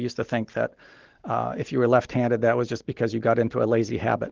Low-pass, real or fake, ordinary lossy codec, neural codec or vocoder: 7.2 kHz; real; Opus, 16 kbps; none